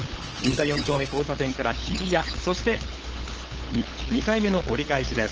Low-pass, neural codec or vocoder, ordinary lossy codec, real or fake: 7.2 kHz; codec, 16 kHz, 8 kbps, FunCodec, trained on LibriTTS, 25 frames a second; Opus, 16 kbps; fake